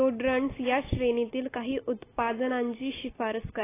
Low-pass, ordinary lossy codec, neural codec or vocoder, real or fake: 3.6 kHz; AAC, 16 kbps; none; real